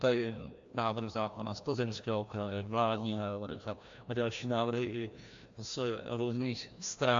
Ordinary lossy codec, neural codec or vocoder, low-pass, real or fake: AAC, 64 kbps; codec, 16 kHz, 1 kbps, FreqCodec, larger model; 7.2 kHz; fake